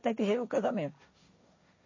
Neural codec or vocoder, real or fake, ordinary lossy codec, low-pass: codec, 16 kHz, 1.1 kbps, Voila-Tokenizer; fake; MP3, 32 kbps; 7.2 kHz